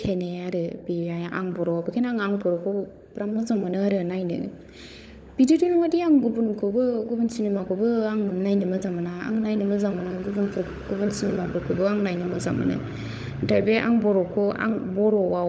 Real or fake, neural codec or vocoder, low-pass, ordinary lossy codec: fake; codec, 16 kHz, 16 kbps, FunCodec, trained on LibriTTS, 50 frames a second; none; none